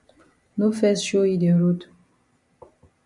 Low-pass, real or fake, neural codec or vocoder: 10.8 kHz; real; none